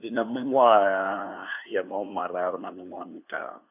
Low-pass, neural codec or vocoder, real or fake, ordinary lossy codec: 3.6 kHz; codec, 16 kHz, 2 kbps, FunCodec, trained on LibriTTS, 25 frames a second; fake; AAC, 32 kbps